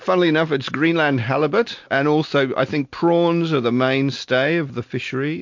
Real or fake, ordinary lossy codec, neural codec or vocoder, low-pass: real; MP3, 48 kbps; none; 7.2 kHz